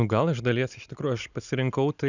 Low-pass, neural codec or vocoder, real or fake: 7.2 kHz; none; real